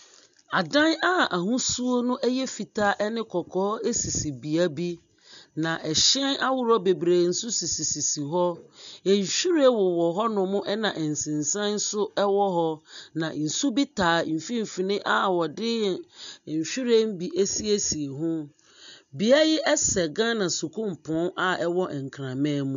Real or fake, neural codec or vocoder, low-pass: real; none; 7.2 kHz